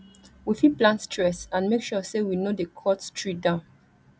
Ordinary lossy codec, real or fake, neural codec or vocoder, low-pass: none; real; none; none